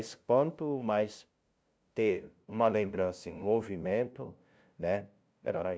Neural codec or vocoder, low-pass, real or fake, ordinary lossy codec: codec, 16 kHz, 0.5 kbps, FunCodec, trained on LibriTTS, 25 frames a second; none; fake; none